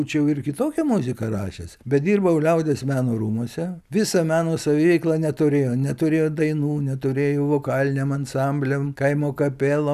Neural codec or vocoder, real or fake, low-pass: none; real; 14.4 kHz